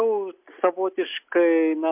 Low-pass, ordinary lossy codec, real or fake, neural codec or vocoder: 3.6 kHz; MP3, 32 kbps; real; none